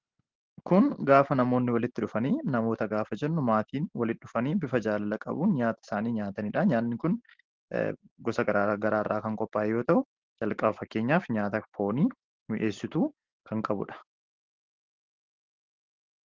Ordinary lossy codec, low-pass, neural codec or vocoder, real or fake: Opus, 16 kbps; 7.2 kHz; none; real